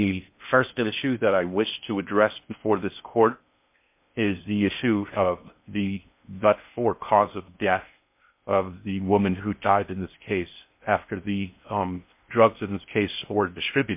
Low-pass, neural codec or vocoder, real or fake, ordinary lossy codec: 3.6 kHz; codec, 16 kHz in and 24 kHz out, 0.6 kbps, FocalCodec, streaming, 4096 codes; fake; MP3, 32 kbps